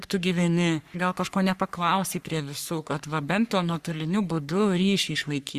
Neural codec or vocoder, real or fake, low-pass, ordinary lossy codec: codec, 44.1 kHz, 3.4 kbps, Pupu-Codec; fake; 14.4 kHz; Opus, 64 kbps